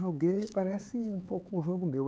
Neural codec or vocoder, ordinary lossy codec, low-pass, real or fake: codec, 16 kHz, 4 kbps, X-Codec, HuBERT features, trained on LibriSpeech; none; none; fake